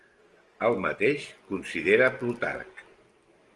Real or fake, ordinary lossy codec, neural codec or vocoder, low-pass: real; Opus, 24 kbps; none; 10.8 kHz